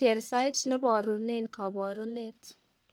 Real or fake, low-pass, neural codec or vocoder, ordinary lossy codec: fake; none; codec, 44.1 kHz, 1.7 kbps, Pupu-Codec; none